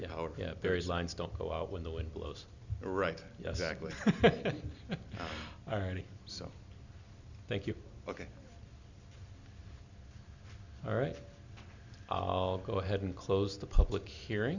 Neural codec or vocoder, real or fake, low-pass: none; real; 7.2 kHz